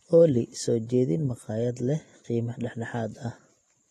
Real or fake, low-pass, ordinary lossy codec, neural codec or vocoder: real; 19.8 kHz; AAC, 32 kbps; none